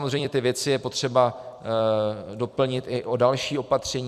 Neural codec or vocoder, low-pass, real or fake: vocoder, 44.1 kHz, 128 mel bands every 256 samples, BigVGAN v2; 14.4 kHz; fake